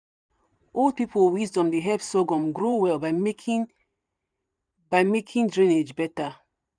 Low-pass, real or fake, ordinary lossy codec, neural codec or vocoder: 9.9 kHz; fake; none; vocoder, 44.1 kHz, 128 mel bands every 512 samples, BigVGAN v2